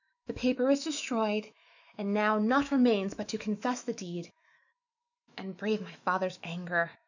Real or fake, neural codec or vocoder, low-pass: fake; autoencoder, 48 kHz, 128 numbers a frame, DAC-VAE, trained on Japanese speech; 7.2 kHz